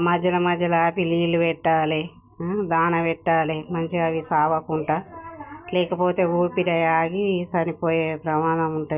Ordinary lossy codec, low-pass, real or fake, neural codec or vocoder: none; 3.6 kHz; real; none